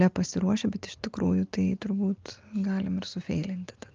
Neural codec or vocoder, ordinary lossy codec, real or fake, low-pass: none; Opus, 24 kbps; real; 7.2 kHz